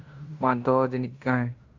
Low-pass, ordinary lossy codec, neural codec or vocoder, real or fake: 7.2 kHz; Opus, 64 kbps; codec, 16 kHz in and 24 kHz out, 0.9 kbps, LongCat-Audio-Codec, fine tuned four codebook decoder; fake